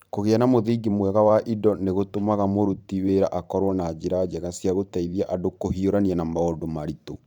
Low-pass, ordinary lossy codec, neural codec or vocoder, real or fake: 19.8 kHz; none; vocoder, 44.1 kHz, 128 mel bands every 512 samples, BigVGAN v2; fake